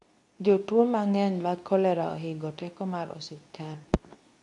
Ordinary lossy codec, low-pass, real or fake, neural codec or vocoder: AAC, 64 kbps; 10.8 kHz; fake; codec, 24 kHz, 0.9 kbps, WavTokenizer, medium speech release version 2